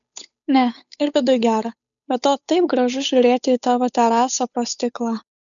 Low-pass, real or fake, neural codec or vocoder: 7.2 kHz; fake; codec, 16 kHz, 8 kbps, FunCodec, trained on Chinese and English, 25 frames a second